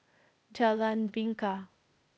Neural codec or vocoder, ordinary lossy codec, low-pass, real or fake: codec, 16 kHz, 0.8 kbps, ZipCodec; none; none; fake